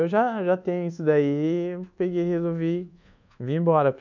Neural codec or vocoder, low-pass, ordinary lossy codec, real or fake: codec, 24 kHz, 1.2 kbps, DualCodec; 7.2 kHz; none; fake